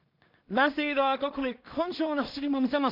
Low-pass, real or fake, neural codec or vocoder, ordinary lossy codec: 5.4 kHz; fake; codec, 16 kHz in and 24 kHz out, 0.4 kbps, LongCat-Audio-Codec, two codebook decoder; MP3, 48 kbps